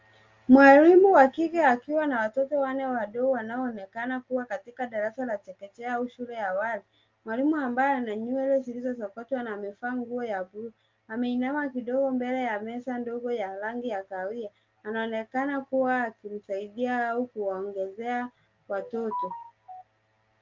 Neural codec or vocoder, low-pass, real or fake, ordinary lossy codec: none; 7.2 kHz; real; Opus, 32 kbps